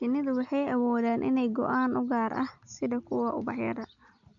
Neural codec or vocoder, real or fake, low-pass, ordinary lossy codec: none; real; 7.2 kHz; MP3, 64 kbps